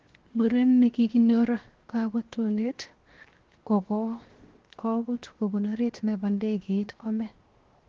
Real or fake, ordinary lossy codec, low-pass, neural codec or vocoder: fake; Opus, 16 kbps; 7.2 kHz; codec, 16 kHz, 0.7 kbps, FocalCodec